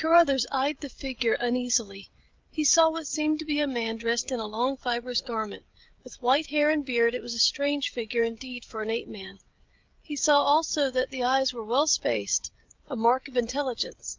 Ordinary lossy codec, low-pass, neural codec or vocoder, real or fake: Opus, 24 kbps; 7.2 kHz; codec, 16 kHz, 16 kbps, FreqCodec, smaller model; fake